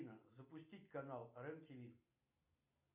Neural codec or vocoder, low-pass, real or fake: none; 3.6 kHz; real